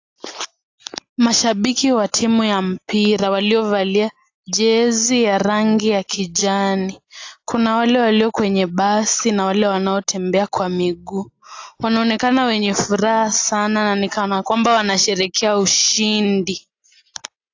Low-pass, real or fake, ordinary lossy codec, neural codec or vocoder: 7.2 kHz; real; AAC, 48 kbps; none